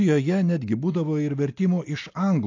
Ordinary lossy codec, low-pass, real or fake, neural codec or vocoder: AAC, 48 kbps; 7.2 kHz; real; none